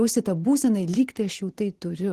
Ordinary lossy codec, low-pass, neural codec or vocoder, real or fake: Opus, 16 kbps; 14.4 kHz; vocoder, 44.1 kHz, 128 mel bands every 512 samples, BigVGAN v2; fake